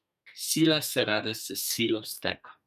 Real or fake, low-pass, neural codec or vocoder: fake; 14.4 kHz; codec, 32 kHz, 1.9 kbps, SNAC